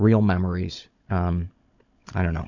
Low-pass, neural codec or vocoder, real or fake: 7.2 kHz; codec, 16 kHz, 16 kbps, FunCodec, trained on Chinese and English, 50 frames a second; fake